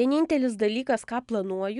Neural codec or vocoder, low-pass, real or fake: none; 10.8 kHz; real